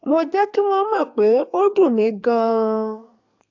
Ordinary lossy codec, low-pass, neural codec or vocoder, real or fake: AAC, 48 kbps; 7.2 kHz; codec, 32 kHz, 1.9 kbps, SNAC; fake